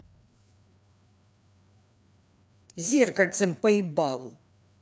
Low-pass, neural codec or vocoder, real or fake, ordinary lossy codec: none; codec, 16 kHz, 2 kbps, FreqCodec, larger model; fake; none